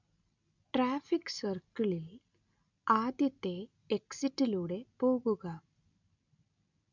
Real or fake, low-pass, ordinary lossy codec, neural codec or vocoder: real; 7.2 kHz; none; none